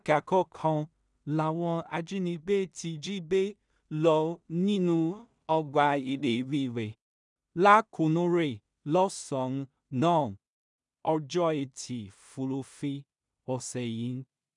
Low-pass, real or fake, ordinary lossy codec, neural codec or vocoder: 10.8 kHz; fake; none; codec, 16 kHz in and 24 kHz out, 0.4 kbps, LongCat-Audio-Codec, two codebook decoder